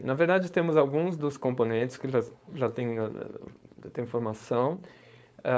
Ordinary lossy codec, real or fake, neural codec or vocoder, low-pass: none; fake; codec, 16 kHz, 4.8 kbps, FACodec; none